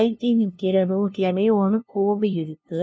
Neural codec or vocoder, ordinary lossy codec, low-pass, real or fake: codec, 16 kHz, 0.5 kbps, FunCodec, trained on LibriTTS, 25 frames a second; none; none; fake